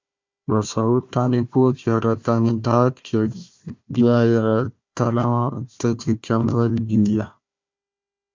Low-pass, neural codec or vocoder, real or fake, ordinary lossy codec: 7.2 kHz; codec, 16 kHz, 1 kbps, FunCodec, trained on Chinese and English, 50 frames a second; fake; MP3, 64 kbps